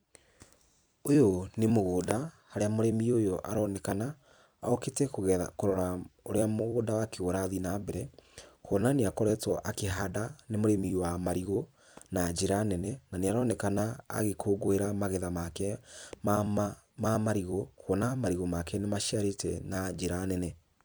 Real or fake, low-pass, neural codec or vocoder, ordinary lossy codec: fake; none; vocoder, 44.1 kHz, 128 mel bands every 256 samples, BigVGAN v2; none